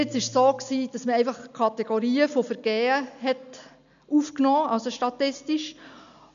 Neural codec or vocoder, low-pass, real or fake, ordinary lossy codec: none; 7.2 kHz; real; none